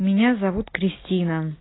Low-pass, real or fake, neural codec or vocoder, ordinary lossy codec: 7.2 kHz; real; none; AAC, 16 kbps